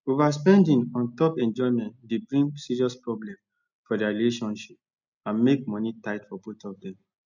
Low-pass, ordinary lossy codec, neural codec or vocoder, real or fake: 7.2 kHz; none; none; real